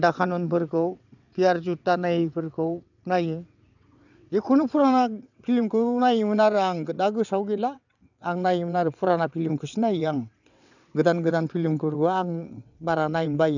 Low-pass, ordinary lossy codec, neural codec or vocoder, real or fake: 7.2 kHz; none; vocoder, 22.05 kHz, 80 mel bands, WaveNeXt; fake